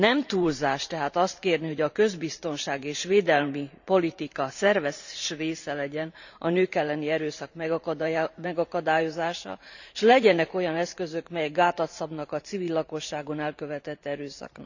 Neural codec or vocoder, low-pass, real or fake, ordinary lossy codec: vocoder, 44.1 kHz, 128 mel bands every 512 samples, BigVGAN v2; 7.2 kHz; fake; none